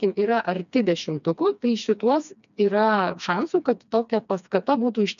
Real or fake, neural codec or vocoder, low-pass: fake; codec, 16 kHz, 2 kbps, FreqCodec, smaller model; 7.2 kHz